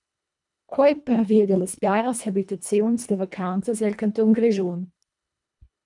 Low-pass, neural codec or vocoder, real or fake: 10.8 kHz; codec, 24 kHz, 1.5 kbps, HILCodec; fake